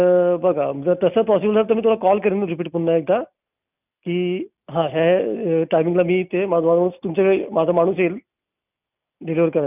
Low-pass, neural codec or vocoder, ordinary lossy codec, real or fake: 3.6 kHz; none; none; real